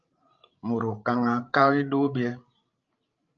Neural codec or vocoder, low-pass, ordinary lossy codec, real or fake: codec, 16 kHz, 16 kbps, FreqCodec, larger model; 7.2 kHz; Opus, 24 kbps; fake